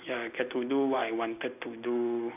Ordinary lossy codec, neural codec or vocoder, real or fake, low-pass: none; none; real; 3.6 kHz